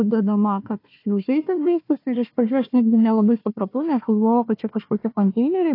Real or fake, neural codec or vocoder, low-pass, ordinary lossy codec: fake; codec, 16 kHz, 1 kbps, FunCodec, trained on Chinese and English, 50 frames a second; 5.4 kHz; AAC, 32 kbps